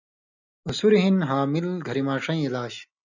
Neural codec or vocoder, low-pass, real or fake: none; 7.2 kHz; real